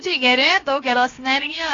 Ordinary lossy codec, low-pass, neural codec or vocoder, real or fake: AAC, 32 kbps; 7.2 kHz; codec, 16 kHz, 0.7 kbps, FocalCodec; fake